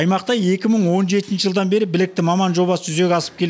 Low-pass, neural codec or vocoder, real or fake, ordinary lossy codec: none; none; real; none